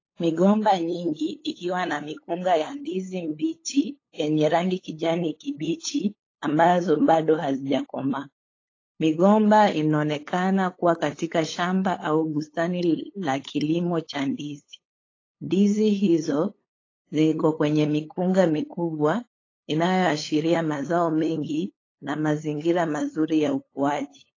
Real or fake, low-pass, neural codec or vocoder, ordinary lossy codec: fake; 7.2 kHz; codec, 16 kHz, 8 kbps, FunCodec, trained on LibriTTS, 25 frames a second; AAC, 32 kbps